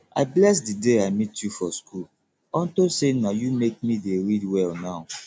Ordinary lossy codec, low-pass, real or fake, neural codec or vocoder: none; none; real; none